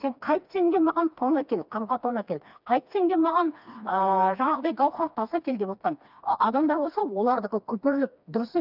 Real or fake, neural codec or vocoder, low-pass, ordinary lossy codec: fake; codec, 16 kHz, 2 kbps, FreqCodec, smaller model; 5.4 kHz; none